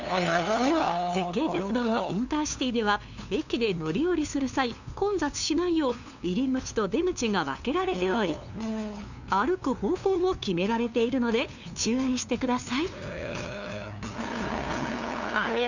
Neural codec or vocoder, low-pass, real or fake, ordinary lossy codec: codec, 16 kHz, 2 kbps, FunCodec, trained on LibriTTS, 25 frames a second; 7.2 kHz; fake; none